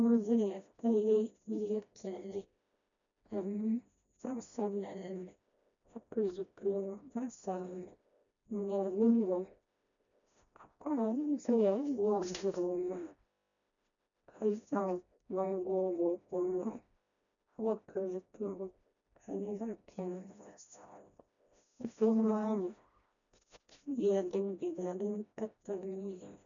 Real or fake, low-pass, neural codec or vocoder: fake; 7.2 kHz; codec, 16 kHz, 1 kbps, FreqCodec, smaller model